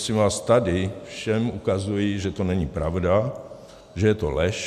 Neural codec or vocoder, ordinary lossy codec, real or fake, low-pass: none; MP3, 96 kbps; real; 14.4 kHz